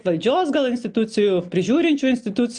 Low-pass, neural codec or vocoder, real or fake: 9.9 kHz; none; real